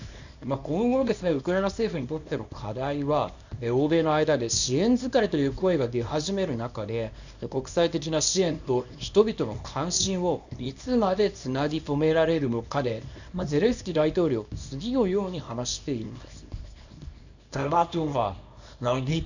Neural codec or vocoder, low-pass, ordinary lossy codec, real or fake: codec, 24 kHz, 0.9 kbps, WavTokenizer, small release; 7.2 kHz; none; fake